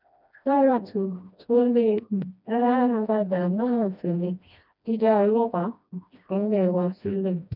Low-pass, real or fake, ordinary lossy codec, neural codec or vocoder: 5.4 kHz; fake; none; codec, 16 kHz, 1 kbps, FreqCodec, smaller model